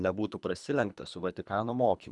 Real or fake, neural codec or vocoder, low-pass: fake; codec, 24 kHz, 3 kbps, HILCodec; 10.8 kHz